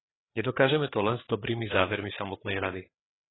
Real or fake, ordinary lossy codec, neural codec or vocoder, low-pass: fake; AAC, 16 kbps; codec, 16 kHz, 4 kbps, FreqCodec, larger model; 7.2 kHz